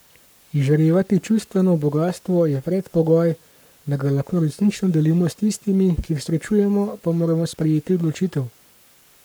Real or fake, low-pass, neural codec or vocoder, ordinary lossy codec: fake; none; codec, 44.1 kHz, 7.8 kbps, Pupu-Codec; none